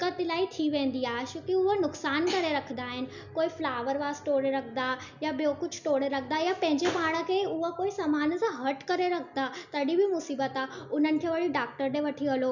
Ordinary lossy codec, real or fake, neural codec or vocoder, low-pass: none; real; none; 7.2 kHz